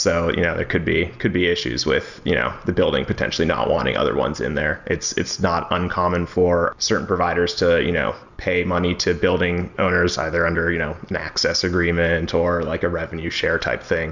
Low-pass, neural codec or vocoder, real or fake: 7.2 kHz; none; real